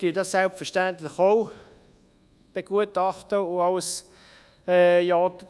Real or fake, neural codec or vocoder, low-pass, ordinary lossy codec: fake; codec, 24 kHz, 1.2 kbps, DualCodec; none; none